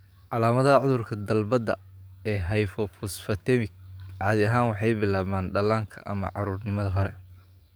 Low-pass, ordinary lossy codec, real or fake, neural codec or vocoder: none; none; fake; codec, 44.1 kHz, 7.8 kbps, DAC